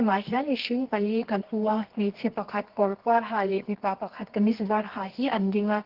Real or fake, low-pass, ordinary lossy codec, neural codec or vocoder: fake; 5.4 kHz; Opus, 16 kbps; codec, 24 kHz, 0.9 kbps, WavTokenizer, medium music audio release